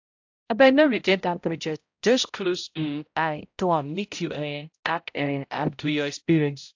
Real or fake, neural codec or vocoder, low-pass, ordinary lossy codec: fake; codec, 16 kHz, 0.5 kbps, X-Codec, HuBERT features, trained on balanced general audio; 7.2 kHz; AAC, 48 kbps